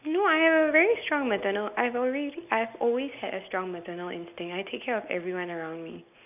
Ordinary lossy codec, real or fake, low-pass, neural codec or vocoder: none; real; 3.6 kHz; none